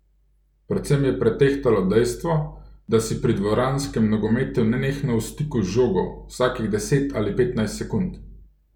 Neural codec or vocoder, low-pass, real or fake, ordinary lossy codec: none; 19.8 kHz; real; none